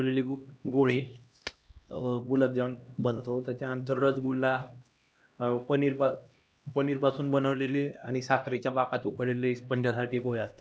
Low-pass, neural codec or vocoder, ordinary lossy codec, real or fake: none; codec, 16 kHz, 1 kbps, X-Codec, HuBERT features, trained on LibriSpeech; none; fake